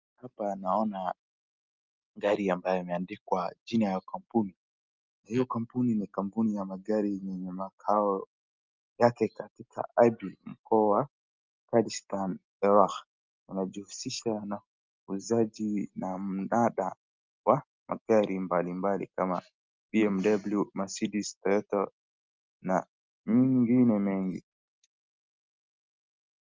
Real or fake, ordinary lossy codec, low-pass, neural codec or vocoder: real; Opus, 24 kbps; 7.2 kHz; none